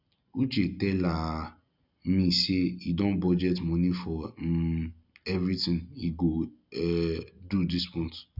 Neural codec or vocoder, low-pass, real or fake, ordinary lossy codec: none; 5.4 kHz; real; none